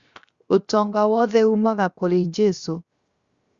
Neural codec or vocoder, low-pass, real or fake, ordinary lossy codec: codec, 16 kHz, 0.7 kbps, FocalCodec; 7.2 kHz; fake; Opus, 64 kbps